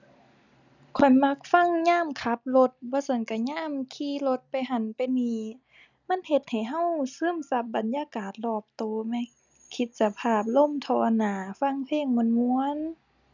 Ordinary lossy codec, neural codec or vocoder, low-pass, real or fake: none; none; 7.2 kHz; real